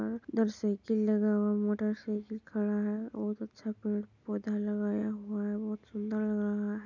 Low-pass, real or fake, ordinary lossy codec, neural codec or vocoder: 7.2 kHz; real; none; none